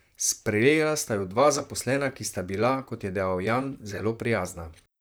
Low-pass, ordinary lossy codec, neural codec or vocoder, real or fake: none; none; vocoder, 44.1 kHz, 128 mel bands, Pupu-Vocoder; fake